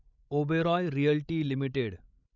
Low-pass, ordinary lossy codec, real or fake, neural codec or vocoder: 7.2 kHz; none; fake; codec, 16 kHz, 16 kbps, FreqCodec, larger model